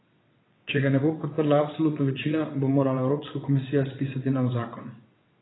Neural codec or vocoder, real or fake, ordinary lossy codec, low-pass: vocoder, 22.05 kHz, 80 mel bands, WaveNeXt; fake; AAC, 16 kbps; 7.2 kHz